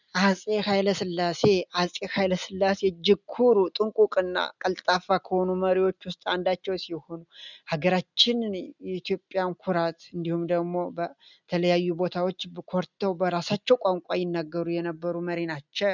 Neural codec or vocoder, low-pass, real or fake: none; 7.2 kHz; real